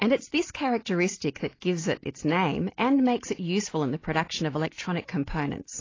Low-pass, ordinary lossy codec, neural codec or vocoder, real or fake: 7.2 kHz; AAC, 32 kbps; none; real